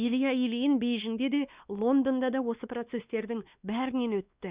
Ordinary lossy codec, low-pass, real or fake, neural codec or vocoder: Opus, 64 kbps; 3.6 kHz; fake; codec, 16 kHz, 2 kbps, X-Codec, WavLM features, trained on Multilingual LibriSpeech